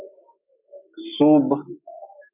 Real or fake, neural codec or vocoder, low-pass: real; none; 3.6 kHz